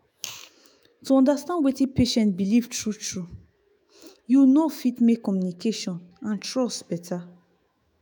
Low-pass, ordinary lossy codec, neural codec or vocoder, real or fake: none; none; autoencoder, 48 kHz, 128 numbers a frame, DAC-VAE, trained on Japanese speech; fake